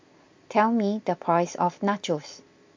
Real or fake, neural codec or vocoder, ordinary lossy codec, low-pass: real; none; MP3, 48 kbps; 7.2 kHz